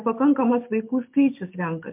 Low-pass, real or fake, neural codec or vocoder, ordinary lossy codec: 3.6 kHz; real; none; MP3, 32 kbps